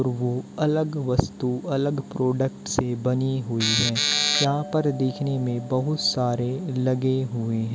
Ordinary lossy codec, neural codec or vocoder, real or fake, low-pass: none; none; real; none